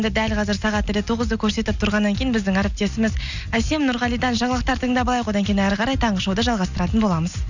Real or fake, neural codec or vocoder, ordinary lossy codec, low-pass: real; none; none; 7.2 kHz